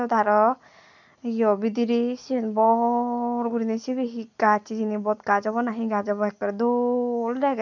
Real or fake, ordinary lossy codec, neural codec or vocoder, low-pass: real; none; none; 7.2 kHz